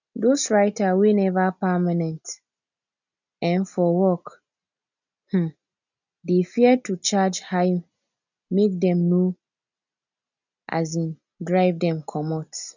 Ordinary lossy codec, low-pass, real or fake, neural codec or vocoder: none; 7.2 kHz; real; none